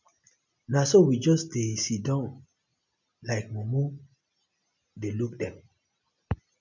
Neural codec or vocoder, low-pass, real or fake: none; 7.2 kHz; real